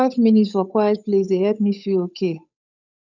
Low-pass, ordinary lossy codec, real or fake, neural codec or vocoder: 7.2 kHz; none; fake; codec, 16 kHz, 8 kbps, FunCodec, trained on Chinese and English, 25 frames a second